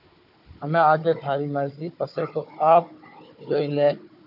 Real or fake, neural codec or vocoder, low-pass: fake; codec, 16 kHz, 4 kbps, FunCodec, trained on Chinese and English, 50 frames a second; 5.4 kHz